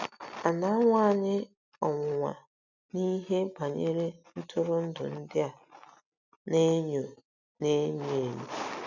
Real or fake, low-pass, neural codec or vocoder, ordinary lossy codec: real; 7.2 kHz; none; none